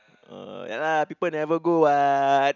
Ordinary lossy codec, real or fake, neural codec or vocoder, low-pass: none; real; none; 7.2 kHz